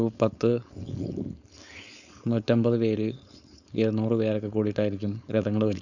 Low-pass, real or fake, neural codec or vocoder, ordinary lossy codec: 7.2 kHz; fake; codec, 16 kHz, 4.8 kbps, FACodec; none